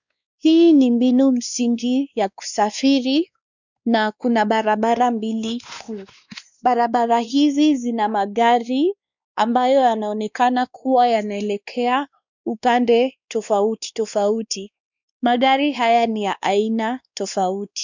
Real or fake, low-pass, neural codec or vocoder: fake; 7.2 kHz; codec, 16 kHz, 2 kbps, X-Codec, WavLM features, trained on Multilingual LibriSpeech